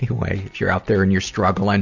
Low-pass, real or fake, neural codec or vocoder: 7.2 kHz; real; none